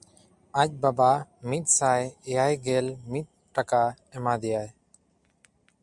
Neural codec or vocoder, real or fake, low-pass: none; real; 10.8 kHz